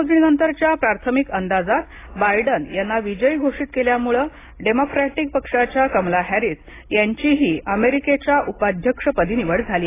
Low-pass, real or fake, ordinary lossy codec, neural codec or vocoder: 3.6 kHz; real; AAC, 16 kbps; none